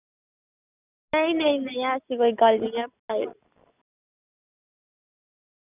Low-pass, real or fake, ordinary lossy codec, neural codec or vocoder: 3.6 kHz; real; none; none